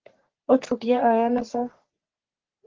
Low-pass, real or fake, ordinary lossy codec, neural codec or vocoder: 7.2 kHz; fake; Opus, 16 kbps; codec, 44.1 kHz, 3.4 kbps, Pupu-Codec